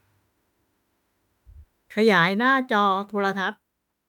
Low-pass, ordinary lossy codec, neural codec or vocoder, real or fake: 19.8 kHz; none; autoencoder, 48 kHz, 32 numbers a frame, DAC-VAE, trained on Japanese speech; fake